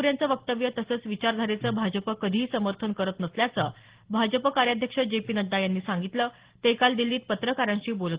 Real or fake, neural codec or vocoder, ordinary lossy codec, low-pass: real; none; Opus, 16 kbps; 3.6 kHz